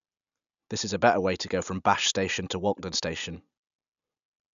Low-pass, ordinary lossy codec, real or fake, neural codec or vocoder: 7.2 kHz; MP3, 96 kbps; real; none